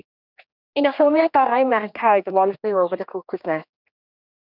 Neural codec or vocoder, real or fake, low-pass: codec, 16 kHz, 2 kbps, X-Codec, HuBERT features, trained on general audio; fake; 5.4 kHz